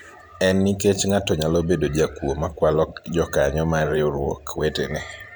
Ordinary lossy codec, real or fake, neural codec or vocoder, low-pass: none; fake; vocoder, 44.1 kHz, 128 mel bands every 512 samples, BigVGAN v2; none